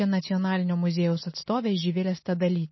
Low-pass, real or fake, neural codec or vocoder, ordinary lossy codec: 7.2 kHz; real; none; MP3, 24 kbps